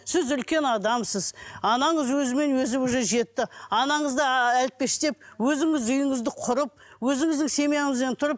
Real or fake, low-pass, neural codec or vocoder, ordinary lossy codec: real; none; none; none